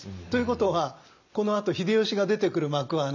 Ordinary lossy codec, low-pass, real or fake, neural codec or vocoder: none; 7.2 kHz; real; none